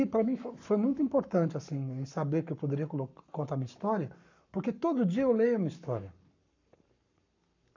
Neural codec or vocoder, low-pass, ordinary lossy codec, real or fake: codec, 44.1 kHz, 7.8 kbps, Pupu-Codec; 7.2 kHz; none; fake